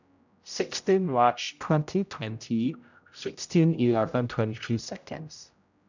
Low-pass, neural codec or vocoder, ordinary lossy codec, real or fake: 7.2 kHz; codec, 16 kHz, 0.5 kbps, X-Codec, HuBERT features, trained on general audio; none; fake